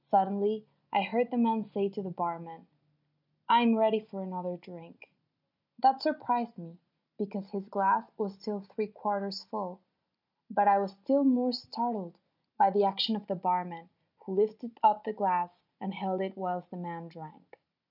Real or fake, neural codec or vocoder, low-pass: real; none; 5.4 kHz